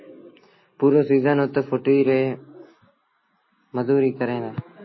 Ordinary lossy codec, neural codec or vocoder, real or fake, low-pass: MP3, 24 kbps; none; real; 7.2 kHz